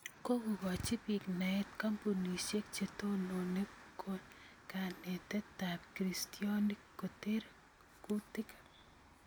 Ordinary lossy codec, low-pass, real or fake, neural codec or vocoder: none; none; real; none